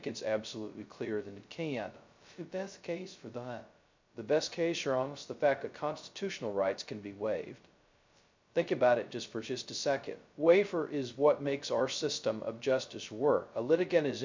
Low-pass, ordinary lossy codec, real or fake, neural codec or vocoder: 7.2 kHz; MP3, 64 kbps; fake; codec, 16 kHz, 0.2 kbps, FocalCodec